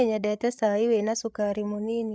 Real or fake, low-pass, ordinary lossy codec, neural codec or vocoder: fake; none; none; codec, 16 kHz, 8 kbps, FreqCodec, larger model